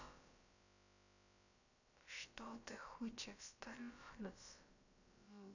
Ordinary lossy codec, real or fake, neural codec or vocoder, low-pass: none; fake; codec, 16 kHz, about 1 kbps, DyCAST, with the encoder's durations; 7.2 kHz